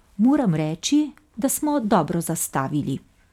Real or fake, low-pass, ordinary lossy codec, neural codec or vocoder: real; 19.8 kHz; none; none